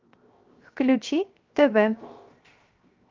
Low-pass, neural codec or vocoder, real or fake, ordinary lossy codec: 7.2 kHz; codec, 16 kHz, 0.7 kbps, FocalCodec; fake; Opus, 24 kbps